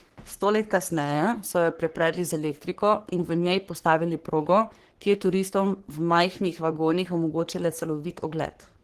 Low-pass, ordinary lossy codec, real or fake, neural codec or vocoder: 14.4 kHz; Opus, 16 kbps; fake; codec, 44.1 kHz, 3.4 kbps, Pupu-Codec